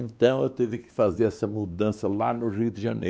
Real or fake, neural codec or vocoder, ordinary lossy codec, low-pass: fake; codec, 16 kHz, 2 kbps, X-Codec, WavLM features, trained on Multilingual LibriSpeech; none; none